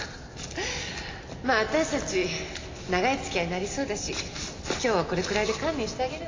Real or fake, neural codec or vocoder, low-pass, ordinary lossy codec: real; none; 7.2 kHz; AAC, 32 kbps